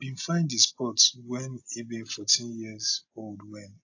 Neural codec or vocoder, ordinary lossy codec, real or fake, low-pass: none; AAC, 48 kbps; real; 7.2 kHz